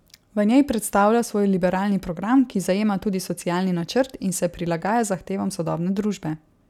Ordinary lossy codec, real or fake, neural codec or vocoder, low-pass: none; real; none; 19.8 kHz